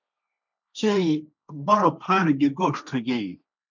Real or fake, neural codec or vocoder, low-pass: fake; codec, 16 kHz, 1.1 kbps, Voila-Tokenizer; 7.2 kHz